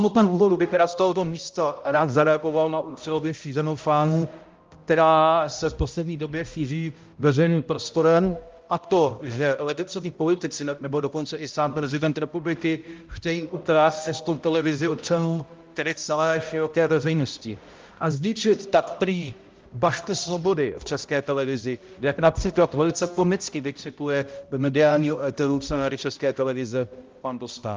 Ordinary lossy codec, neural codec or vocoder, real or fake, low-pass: Opus, 32 kbps; codec, 16 kHz, 0.5 kbps, X-Codec, HuBERT features, trained on balanced general audio; fake; 7.2 kHz